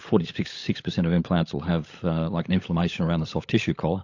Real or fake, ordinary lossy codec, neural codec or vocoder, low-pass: fake; AAC, 48 kbps; codec, 16 kHz, 16 kbps, FunCodec, trained on LibriTTS, 50 frames a second; 7.2 kHz